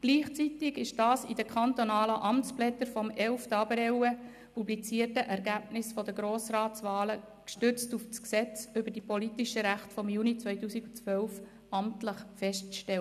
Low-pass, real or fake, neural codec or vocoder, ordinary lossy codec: 14.4 kHz; real; none; none